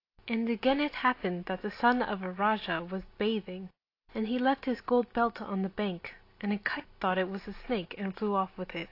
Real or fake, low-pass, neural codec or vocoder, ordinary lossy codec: real; 5.4 kHz; none; AAC, 32 kbps